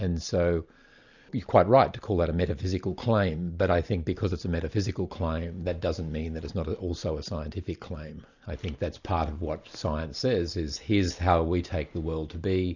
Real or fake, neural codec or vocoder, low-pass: real; none; 7.2 kHz